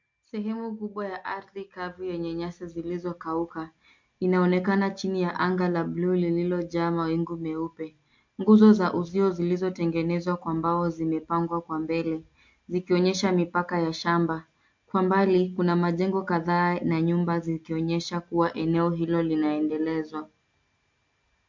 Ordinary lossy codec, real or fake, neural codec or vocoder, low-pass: MP3, 48 kbps; real; none; 7.2 kHz